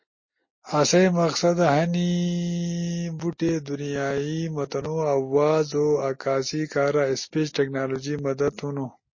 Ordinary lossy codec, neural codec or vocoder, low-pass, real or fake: MP3, 32 kbps; none; 7.2 kHz; real